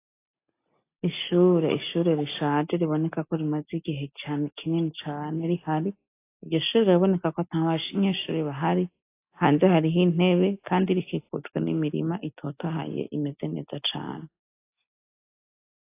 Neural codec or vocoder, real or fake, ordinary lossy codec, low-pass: none; real; AAC, 24 kbps; 3.6 kHz